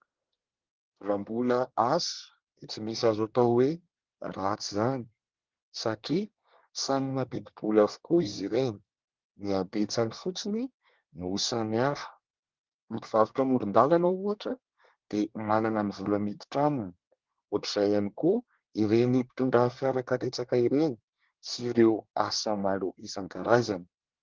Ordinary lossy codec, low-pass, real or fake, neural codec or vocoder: Opus, 16 kbps; 7.2 kHz; fake; codec, 24 kHz, 1 kbps, SNAC